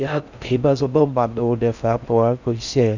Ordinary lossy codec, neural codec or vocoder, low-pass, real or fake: none; codec, 16 kHz in and 24 kHz out, 0.6 kbps, FocalCodec, streaming, 4096 codes; 7.2 kHz; fake